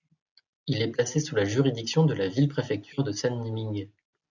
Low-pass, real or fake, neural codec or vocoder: 7.2 kHz; real; none